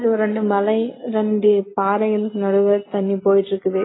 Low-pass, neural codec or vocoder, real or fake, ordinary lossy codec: 7.2 kHz; none; real; AAC, 16 kbps